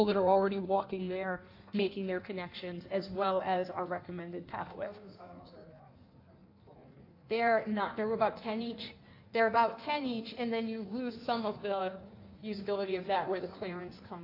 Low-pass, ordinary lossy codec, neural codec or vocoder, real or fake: 5.4 kHz; AAC, 32 kbps; codec, 16 kHz in and 24 kHz out, 1.1 kbps, FireRedTTS-2 codec; fake